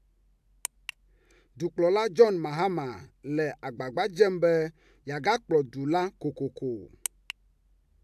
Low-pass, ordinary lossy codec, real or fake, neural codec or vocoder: 14.4 kHz; AAC, 96 kbps; real; none